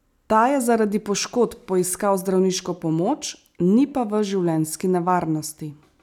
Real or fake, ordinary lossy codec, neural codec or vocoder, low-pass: real; none; none; 19.8 kHz